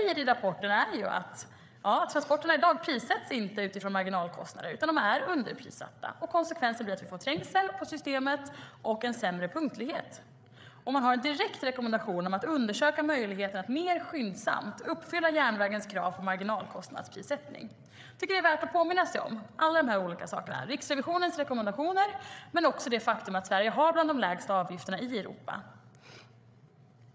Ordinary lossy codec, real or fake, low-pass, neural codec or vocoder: none; fake; none; codec, 16 kHz, 8 kbps, FreqCodec, larger model